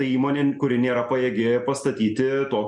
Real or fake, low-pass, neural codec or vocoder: real; 10.8 kHz; none